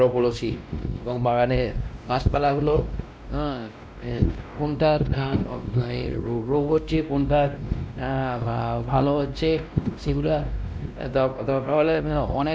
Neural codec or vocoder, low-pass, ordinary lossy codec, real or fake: codec, 16 kHz, 1 kbps, X-Codec, WavLM features, trained on Multilingual LibriSpeech; none; none; fake